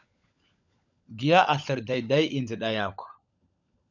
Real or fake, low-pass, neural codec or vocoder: fake; 7.2 kHz; codec, 16 kHz, 16 kbps, FunCodec, trained on LibriTTS, 50 frames a second